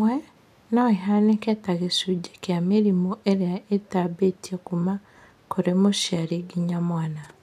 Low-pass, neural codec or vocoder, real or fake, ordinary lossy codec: 14.4 kHz; none; real; none